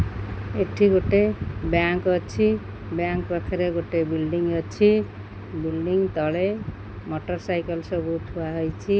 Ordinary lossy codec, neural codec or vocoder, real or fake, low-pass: none; none; real; none